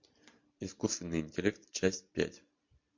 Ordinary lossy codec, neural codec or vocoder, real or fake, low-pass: MP3, 48 kbps; vocoder, 44.1 kHz, 128 mel bands every 512 samples, BigVGAN v2; fake; 7.2 kHz